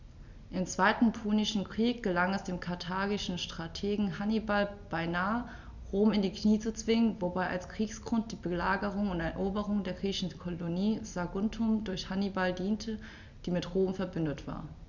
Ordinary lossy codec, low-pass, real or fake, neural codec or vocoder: none; 7.2 kHz; real; none